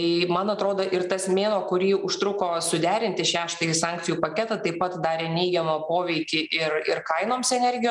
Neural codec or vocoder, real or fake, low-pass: none; real; 10.8 kHz